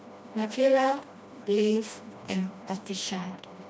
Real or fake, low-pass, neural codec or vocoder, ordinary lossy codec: fake; none; codec, 16 kHz, 1 kbps, FreqCodec, smaller model; none